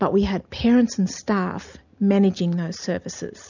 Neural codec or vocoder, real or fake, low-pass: none; real; 7.2 kHz